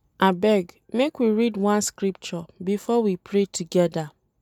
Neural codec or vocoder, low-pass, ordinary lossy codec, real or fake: none; 19.8 kHz; none; real